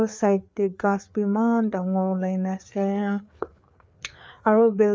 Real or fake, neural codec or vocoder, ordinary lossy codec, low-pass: fake; codec, 16 kHz, 4 kbps, FreqCodec, larger model; none; none